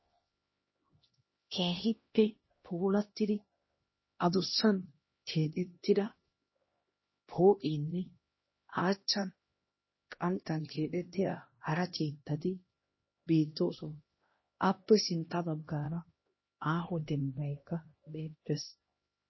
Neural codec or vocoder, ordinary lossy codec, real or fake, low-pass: codec, 16 kHz, 1 kbps, X-Codec, HuBERT features, trained on LibriSpeech; MP3, 24 kbps; fake; 7.2 kHz